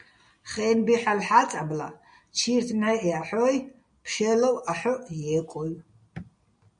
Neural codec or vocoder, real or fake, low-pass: none; real; 9.9 kHz